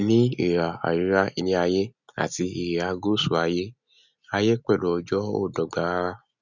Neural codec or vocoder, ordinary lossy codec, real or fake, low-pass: none; none; real; 7.2 kHz